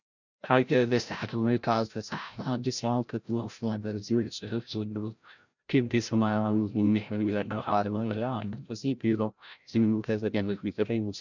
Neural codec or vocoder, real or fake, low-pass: codec, 16 kHz, 0.5 kbps, FreqCodec, larger model; fake; 7.2 kHz